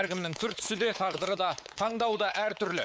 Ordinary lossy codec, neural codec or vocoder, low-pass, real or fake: none; codec, 16 kHz, 4 kbps, X-Codec, WavLM features, trained on Multilingual LibriSpeech; none; fake